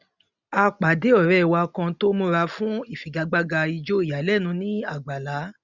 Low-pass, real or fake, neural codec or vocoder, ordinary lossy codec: 7.2 kHz; real; none; none